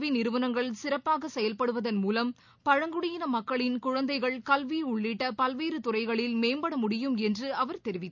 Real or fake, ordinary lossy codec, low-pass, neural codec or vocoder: real; none; 7.2 kHz; none